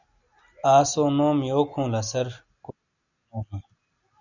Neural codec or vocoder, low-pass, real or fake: none; 7.2 kHz; real